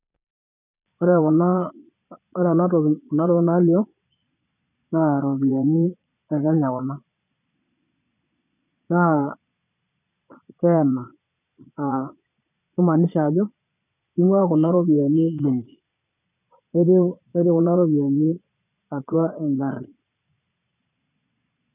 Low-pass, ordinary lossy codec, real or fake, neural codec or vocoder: 3.6 kHz; none; fake; vocoder, 22.05 kHz, 80 mel bands, Vocos